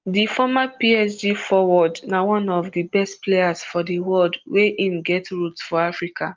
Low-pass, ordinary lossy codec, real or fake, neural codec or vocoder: 7.2 kHz; Opus, 32 kbps; real; none